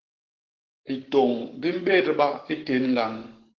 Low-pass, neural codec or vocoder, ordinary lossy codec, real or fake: 7.2 kHz; codec, 44.1 kHz, 7.8 kbps, Pupu-Codec; Opus, 16 kbps; fake